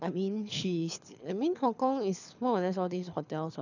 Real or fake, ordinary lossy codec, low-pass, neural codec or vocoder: fake; none; 7.2 kHz; codec, 24 kHz, 6 kbps, HILCodec